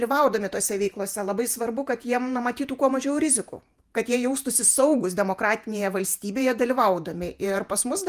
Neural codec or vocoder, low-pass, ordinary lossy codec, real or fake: vocoder, 48 kHz, 128 mel bands, Vocos; 14.4 kHz; Opus, 32 kbps; fake